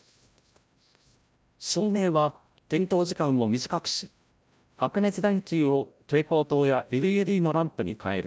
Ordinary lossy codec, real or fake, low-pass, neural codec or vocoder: none; fake; none; codec, 16 kHz, 0.5 kbps, FreqCodec, larger model